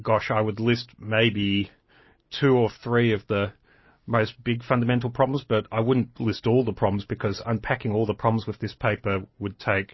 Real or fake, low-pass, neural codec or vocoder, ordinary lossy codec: real; 7.2 kHz; none; MP3, 24 kbps